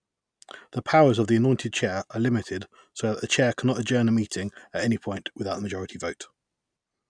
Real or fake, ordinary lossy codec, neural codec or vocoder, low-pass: real; none; none; 9.9 kHz